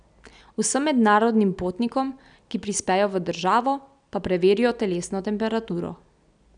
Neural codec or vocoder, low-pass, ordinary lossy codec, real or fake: none; 9.9 kHz; none; real